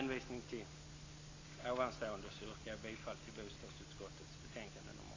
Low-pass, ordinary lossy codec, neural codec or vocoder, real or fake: 7.2 kHz; AAC, 32 kbps; none; real